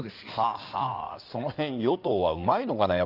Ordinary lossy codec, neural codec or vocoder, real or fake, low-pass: Opus, 24 kbps; codec, 16 kHz in and 24 kHz out, 2.2 kbps, FireRedTTS-2 codec; fake; 5.4 kHz